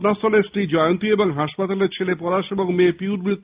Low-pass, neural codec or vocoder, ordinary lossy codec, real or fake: 3.6 kHz; none; Opus, 24 kbps; real